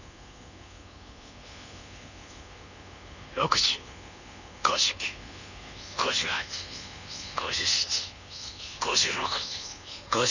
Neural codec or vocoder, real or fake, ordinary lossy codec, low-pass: codec, 24 kHz, 1.2 kbps, DualCodec; fake; none; 7.2 kHz